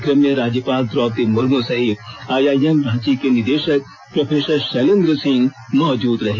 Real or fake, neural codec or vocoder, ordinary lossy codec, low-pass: real; none; none; none